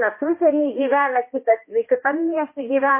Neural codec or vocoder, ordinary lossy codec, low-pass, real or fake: codec, 16 kHz, 1 kbps, X-Codec, HuBERT features, trained on balanced general audio; MP3, 24 kbps; 3.6 kHz; fake